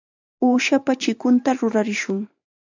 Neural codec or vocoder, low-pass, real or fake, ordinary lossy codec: vocoder, 44.1 kHz, 128 mel bands every 512 samples, BigVGAN v2; 7.2 kHz; fake; AAC, 48 kbps